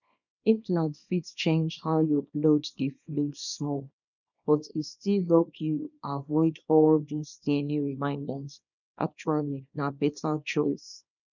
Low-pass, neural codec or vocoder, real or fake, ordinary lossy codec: 7.2 kHz; codec, 24 kHz, 0.9 kbps, WavTokenizer, small release; fake; none